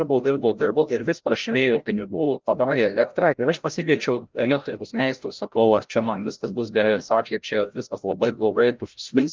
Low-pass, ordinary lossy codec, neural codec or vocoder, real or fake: 7.2 kHz; Opus, 32 kbps; codec, 16 kHz, 0.5 kbps, FreqCodec, larger model; fake